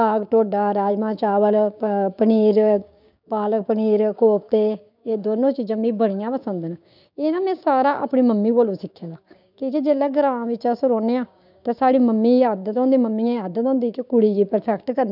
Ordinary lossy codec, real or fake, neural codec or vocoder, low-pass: none; fake; codec, 16 kHz, 6 kbps, DAC; 5.4 kHz